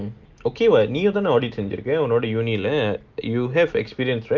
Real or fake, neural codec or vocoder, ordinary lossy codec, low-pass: real; none; Opus, 24 kbps; 7.2 kHz